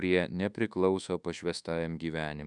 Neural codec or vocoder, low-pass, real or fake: codec, 24 kHz, 1.2 kbps, DualCodec; 10.8 kHz; fake